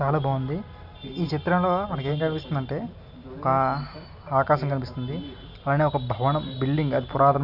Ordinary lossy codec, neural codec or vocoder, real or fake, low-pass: none; none; real; 5.4 kHz